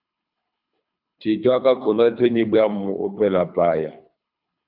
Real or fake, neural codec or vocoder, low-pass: fake; codec, 24 kHz, 3 kbps, HILCodec; 5.4 kHz